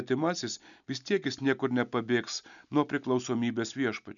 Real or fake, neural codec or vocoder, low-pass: real; none; 7.2 kHz